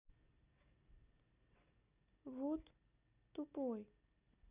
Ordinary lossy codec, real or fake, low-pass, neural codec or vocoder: none; real; 3.6 kHz; none